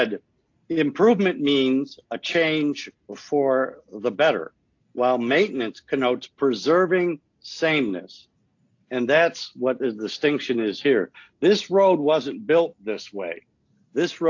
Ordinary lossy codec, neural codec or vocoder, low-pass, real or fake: AAC, 48 kbps; none; 7.2 kHz; real